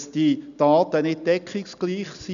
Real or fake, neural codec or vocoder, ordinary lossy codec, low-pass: real; none; none; 7.2 kHz